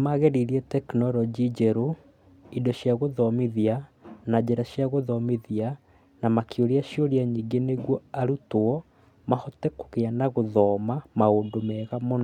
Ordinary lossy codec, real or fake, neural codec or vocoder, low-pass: none; real; none; 19.8 kHz